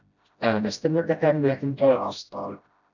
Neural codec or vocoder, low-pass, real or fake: codec, 16 kHz, 0.5 kbps, FreqCodec, smaller model; 7.2 kHz; fake